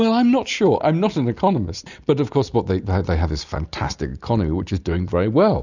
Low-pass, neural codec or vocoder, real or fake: 7.2 kHz; none; real